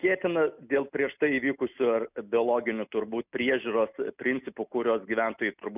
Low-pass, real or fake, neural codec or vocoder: 3.6 kHz; real; none